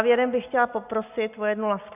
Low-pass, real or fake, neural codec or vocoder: 3.6 kHz; real; none